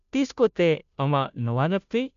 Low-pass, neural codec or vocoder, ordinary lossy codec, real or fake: 7.2 kHz; codec, 16 kHz, 0.5 kbps, FunCodec, trained on Chinese and English, 25 frames a second; AAC, 96 kbps; fake